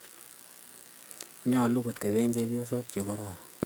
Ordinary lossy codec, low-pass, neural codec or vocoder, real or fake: none; none; codec, 44.1 kHz, 2.6 kbps, SNAC; fake